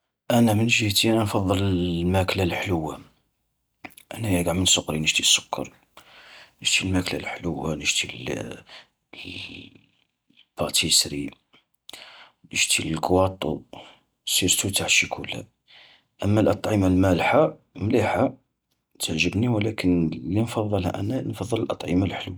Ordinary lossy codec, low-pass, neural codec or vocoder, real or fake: none; none; none; real